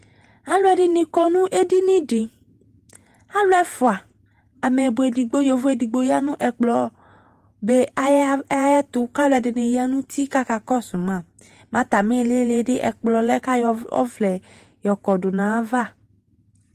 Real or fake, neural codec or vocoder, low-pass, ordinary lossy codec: fake; vocoder, 48 kHz, 128 mel bands, Vocos; 14.4 kHz; Opus, 24 kbps